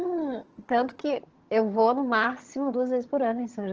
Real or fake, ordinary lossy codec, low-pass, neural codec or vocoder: fake; Opus, 16 kbps; 7.2 kHz; vocoder, 22.05 kHz, 80 mel bands, HiFi-GAN